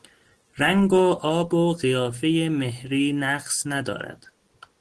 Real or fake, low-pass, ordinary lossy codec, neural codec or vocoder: real; 10.8 kHz; Opus, 16 kbps; none